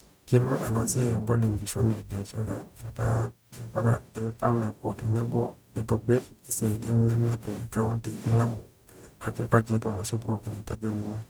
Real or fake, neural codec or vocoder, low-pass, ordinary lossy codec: fake; codec, 44.1 kHz, 0.9 kbps, DAC; none; none